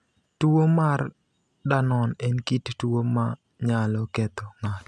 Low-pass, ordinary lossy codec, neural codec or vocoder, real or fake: none; none; none; real